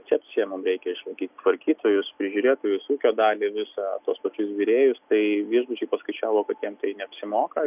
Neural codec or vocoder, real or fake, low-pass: none; real; 3.6 kHz